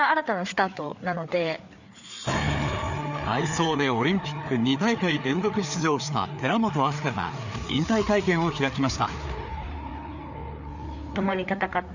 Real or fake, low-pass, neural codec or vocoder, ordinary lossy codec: fake; 7.2 kHz; codec, 16 kHz, 4 kbps, FreqCodec, larger model; none